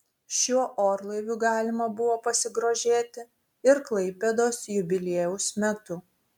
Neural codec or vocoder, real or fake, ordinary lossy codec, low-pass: none; real; MP3, 96 kbps; 19.8 kHz